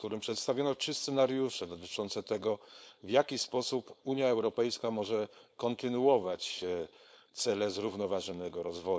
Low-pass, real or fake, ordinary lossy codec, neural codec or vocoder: none; fake; none; codec, 16 kHz, 4.8 kbps, FACodec